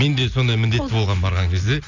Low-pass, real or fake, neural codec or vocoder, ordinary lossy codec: 7.2 kHz; real; none; none